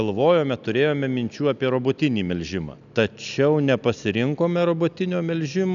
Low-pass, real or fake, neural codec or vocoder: 7.2 kHz; real; none